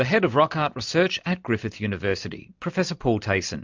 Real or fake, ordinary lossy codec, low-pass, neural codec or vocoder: real; MP3, 64 kbps; 7.2 kHz; none